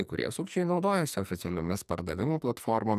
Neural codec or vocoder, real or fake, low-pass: codec, 44.1 kHz, 2.6 kbps, SNAC; fake; 14.4 kHz